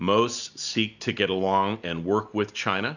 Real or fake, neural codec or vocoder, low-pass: real; none; 7.2 kHz